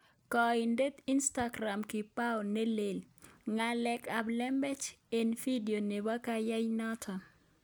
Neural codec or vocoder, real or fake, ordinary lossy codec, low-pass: none; real; none; none